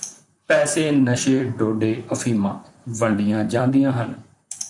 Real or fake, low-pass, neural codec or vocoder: fake; 10.8 kHz; vocoder, 44.1 kHz, 128 mel bands, Pupu-Vocoder